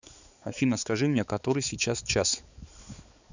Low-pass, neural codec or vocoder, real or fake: 7.2 kHz; codec, 16 kHz, 4 kbps, X-Codec, HuBERT features, trained on balanced general audio; fake